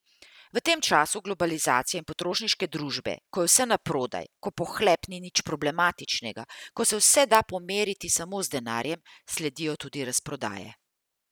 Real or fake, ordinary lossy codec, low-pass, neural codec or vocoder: real; none; none; none